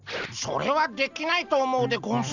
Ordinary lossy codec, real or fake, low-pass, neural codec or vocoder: none; real; 7.2 kHz; none